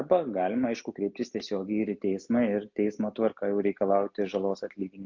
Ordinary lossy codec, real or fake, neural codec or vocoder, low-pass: MP3, 64 kbps; real; none; 7.2 kHz